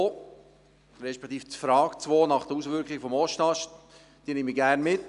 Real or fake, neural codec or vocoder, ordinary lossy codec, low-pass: real; none; none; 10.8 kHz